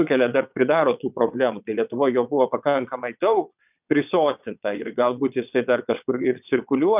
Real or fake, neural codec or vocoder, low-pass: fake; vocoder, 22.05 kHz, 80 mel bands, WaveNeXt; 3.6 kHz